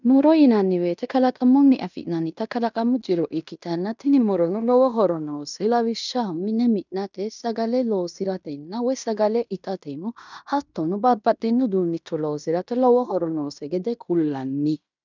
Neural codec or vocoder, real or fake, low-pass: codec, 16 kHz in and 24 kHz out, 0.9 kbps, LongCat-Audio-Codec, fine tuned four codebook decoder; fake; 7.2 kHz